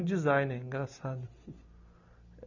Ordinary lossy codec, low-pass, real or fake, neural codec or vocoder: none; 7.2 kHz; real; none